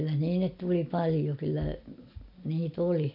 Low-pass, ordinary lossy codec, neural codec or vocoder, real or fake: 5.4 kHz; none; none; real